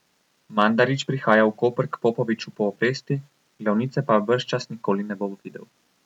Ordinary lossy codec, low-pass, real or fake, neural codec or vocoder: none; 19.8 kHz; real; none